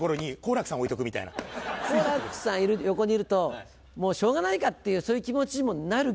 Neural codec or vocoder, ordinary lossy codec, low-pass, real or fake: none; none; none; real